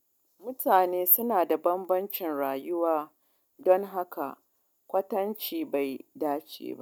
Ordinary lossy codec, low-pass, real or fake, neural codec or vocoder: none; 19.8 kHz; real; none